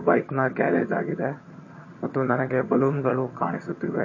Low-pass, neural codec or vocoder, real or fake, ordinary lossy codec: 7.2 kHz; vocoder, 22.05 kHz, 80 mel bands, HiFi-GAN; fake; MP3, 32 kbps